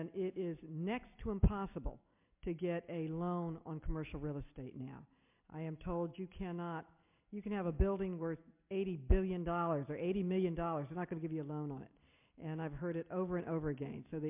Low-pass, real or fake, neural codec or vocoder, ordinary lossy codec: 3.6 kHz; real; none; MP3, 32 kbps